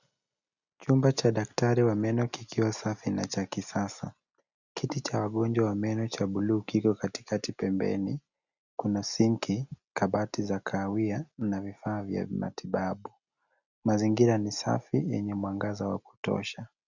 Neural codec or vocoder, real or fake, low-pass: none; real; 7.2 kHz